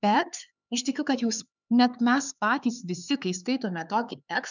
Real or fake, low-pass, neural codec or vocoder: fake; 7.2 kHz; codec, 16 kHz, 4 kbps, X-Codec, HuBERT features, trained on LibriSpeech